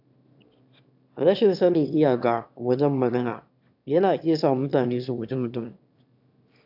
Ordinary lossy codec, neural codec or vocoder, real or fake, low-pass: AAC, 48 kbps; autoencoder, 22.05 kHz, a latent of 192 numbers a frame, VITS, trained on one speaker; fake; 5.4 kHz